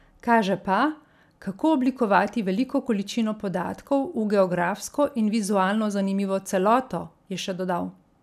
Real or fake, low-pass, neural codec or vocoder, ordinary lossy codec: real; 14.4 kHz; none; none